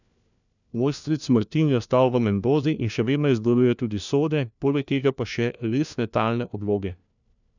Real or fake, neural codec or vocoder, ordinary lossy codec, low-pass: fake; codec, 16 kHz, 1 kbps, FunCodec, trained on LibriTTS, 50 frames a second; none; 7.2 kHz